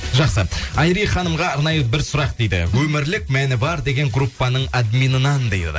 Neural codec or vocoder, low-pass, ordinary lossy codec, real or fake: none; none; none; real